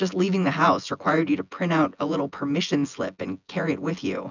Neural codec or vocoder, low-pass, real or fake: vocoder, 24 kHz, 100 mel bands, Vocos; 7.2 kHz; fake